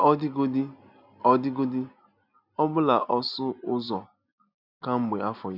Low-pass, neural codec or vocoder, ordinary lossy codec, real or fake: 5.4 kHz; none; none; real